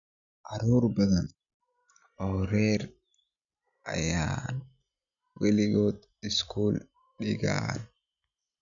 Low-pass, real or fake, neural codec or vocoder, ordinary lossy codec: 7.2 kHz; real; none; none